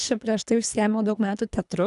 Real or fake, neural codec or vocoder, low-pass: fake; codec, 24 kHz, 3 kbps, HILCodec; 10.8 kHz